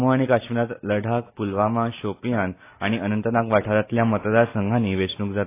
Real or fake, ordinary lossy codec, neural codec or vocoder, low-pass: real; AAC, 24 kbps; none; 3.6 kHz